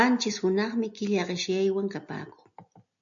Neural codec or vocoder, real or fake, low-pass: none; real; 7.2 kHz